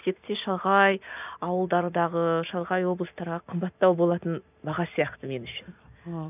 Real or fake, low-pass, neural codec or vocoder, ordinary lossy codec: real; 3.6 kHz; none; none